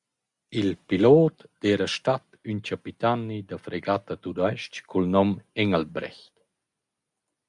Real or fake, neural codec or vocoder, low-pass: real; none; 10.8 kHz